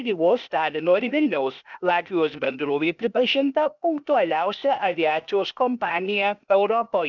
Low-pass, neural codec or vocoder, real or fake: 7.2 kHz; codec, 16 kHz, 0.8 kbps, ZipCodec; fake